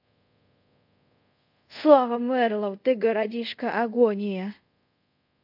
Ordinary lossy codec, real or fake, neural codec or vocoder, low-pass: MP3, 48 kbps; fake; codec, 24 kHz, 0.5 kbps, DualCodec; 5.4 kHz